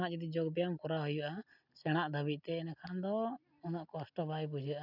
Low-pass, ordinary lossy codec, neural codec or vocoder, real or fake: 5.4 kHz; none; none; real